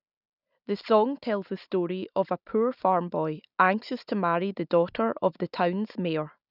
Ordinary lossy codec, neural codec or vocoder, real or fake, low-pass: none; none; real; 5.4 kHz